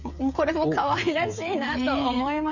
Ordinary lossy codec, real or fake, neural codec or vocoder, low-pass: none; fake; codec, 16 kHz, 8 kbps, FreqCodec, smaller model; 7.2 kHz